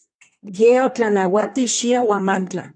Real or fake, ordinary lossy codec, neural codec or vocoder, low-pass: fake; AAC, 64 kbps; codec, 24 kHz, 1 kbps, SNAC; 9.9 kHz